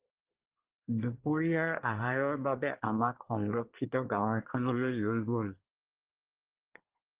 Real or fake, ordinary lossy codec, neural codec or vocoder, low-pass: fake; Opus, 16 kbps; codec, 24 kHz, 1 kbps, SNAC; 3.6 kHz